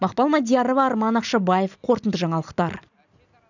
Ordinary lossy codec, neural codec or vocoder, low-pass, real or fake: none; none; 7.2 kHz; real